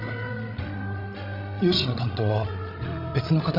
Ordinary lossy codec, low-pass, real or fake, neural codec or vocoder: none; 5.4 kHz; fake; codec, 16 kHz, 16 kbps, FreqCodec, larger model